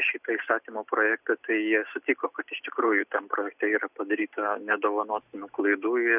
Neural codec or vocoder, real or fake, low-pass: none; real; 3.6 kHz